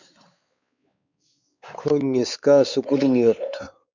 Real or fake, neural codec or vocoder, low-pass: fake; codec, 16 kHz, 4 kbps, X-Codec, WavLM features, trained on Multilingual LibriSpeech; 7.2 kHz